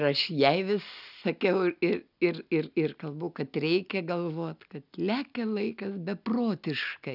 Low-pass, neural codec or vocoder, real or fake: 5.4 kHz; none; real